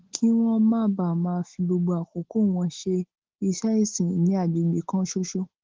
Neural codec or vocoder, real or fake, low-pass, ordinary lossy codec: none; real; 7.2 kHz; Opus, 16 kbps